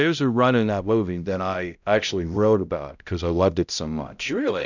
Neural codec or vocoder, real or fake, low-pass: codec, 16 kHz, 0.5 kbps, X-Codec, HuBERT features, trained on balanced general audio; fake; 7.2 kHz